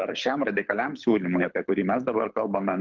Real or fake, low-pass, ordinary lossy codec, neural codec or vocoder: fake; 7.2 kHz; Opus, 32 kbps; codec, 24 kHz, 6 kbps, HILCodec